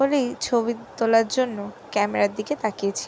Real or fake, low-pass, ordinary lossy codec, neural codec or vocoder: real; none; none; none